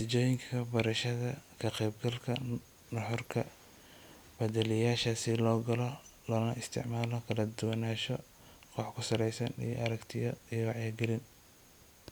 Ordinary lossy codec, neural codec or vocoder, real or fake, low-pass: none; none; real; none